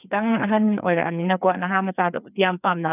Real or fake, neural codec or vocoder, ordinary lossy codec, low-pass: fake; codec, 16 kHz, 2 kbps, FreqCodec, larger model; none; 3.6 kHz